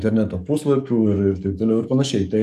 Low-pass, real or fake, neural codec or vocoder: 14.4 kHz; fake; codec, 44.1 kHz, 7.8 kbps, DAC